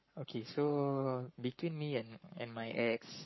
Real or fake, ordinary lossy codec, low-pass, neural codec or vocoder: fake; MP3, 24 kbps; 7.2 kHz; codec, 44.1 kHz, 7.8 kbps, Pupu-Codec